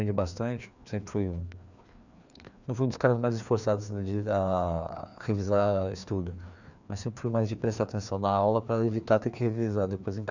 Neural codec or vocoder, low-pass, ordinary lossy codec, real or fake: codec, 16 kHz, 2 kbps, FreqCodec, larger model; 7.2 kHz; none; fake